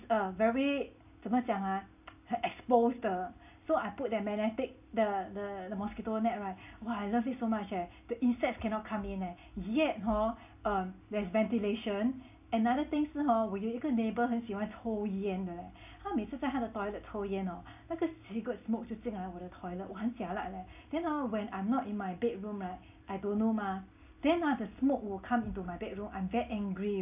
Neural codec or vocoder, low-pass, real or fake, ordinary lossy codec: none; 3.6 kHz; real; AAC, 32 kbps